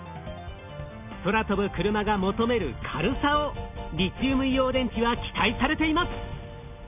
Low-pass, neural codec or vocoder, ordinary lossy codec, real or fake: 3.6 kHz; none; none; real